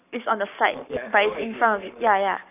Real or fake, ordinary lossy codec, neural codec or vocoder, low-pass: fake; none; codec, 44.1 kHz, 7.8 kbps, Pupu-Codec; 3.6 kHz